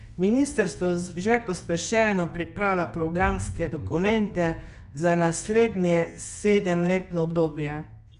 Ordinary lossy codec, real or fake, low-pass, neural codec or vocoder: none; fake; 10.8 kHz; codec, 24 kHz, 0.9 kbps, WavTokenizer, medium music audio release